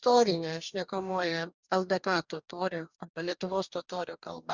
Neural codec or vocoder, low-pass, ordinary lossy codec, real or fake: codec, 44.1 kHz, 2.6 kbps, DAC; 7.2 kHz; Opus, 64 kbps; fake